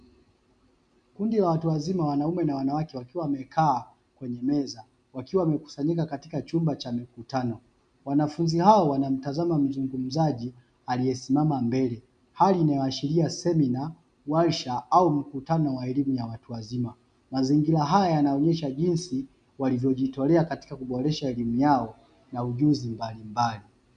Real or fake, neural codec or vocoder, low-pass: real; none; 9.9 kHz